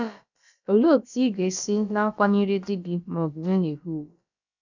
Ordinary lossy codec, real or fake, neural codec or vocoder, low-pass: none; fake; codec, 16 kHz, about 1 kbps, DyCAST, with the encoder's durations; 7.2 kHz